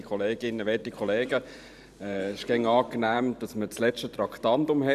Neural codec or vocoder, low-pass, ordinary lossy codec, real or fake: vocoder, 44.1 kHz, 128 mel bands every 512 samples, BigVGAN v2; 14.4 kHz; none; fake